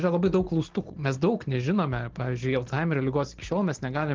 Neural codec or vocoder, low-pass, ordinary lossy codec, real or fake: none; 7.2 kHz; Opus, 16 kbps; real